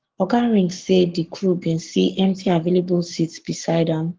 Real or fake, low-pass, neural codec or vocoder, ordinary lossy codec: fake; 7.2 kHz; codec, 44.1 kHz, 7.8 kbps, Pupu-Codec; Opus, 16 kbps